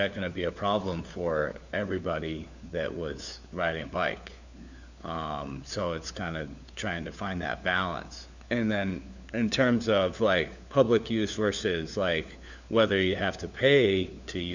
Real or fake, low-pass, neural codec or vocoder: fake; 7.2 kHz; codec, 16 kHz, 4 kbps, FunCodec, trained on LibriTTS, 50 frames a second